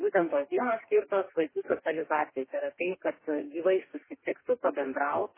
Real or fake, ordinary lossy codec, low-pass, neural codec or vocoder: fake; MP3, 16 kbps; 3.6 kHz; codec, 44.1 kHz, 2.6 kbps, DAC